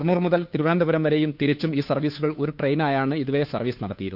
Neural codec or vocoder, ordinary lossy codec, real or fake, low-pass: codec, 16 kHz, 2 kbps, FunCodec, trained on Chinese and English, 25 frames a second; none; fake; 5.4 kHz